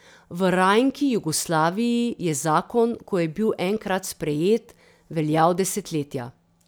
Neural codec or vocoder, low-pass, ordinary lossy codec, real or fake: none; none; none; real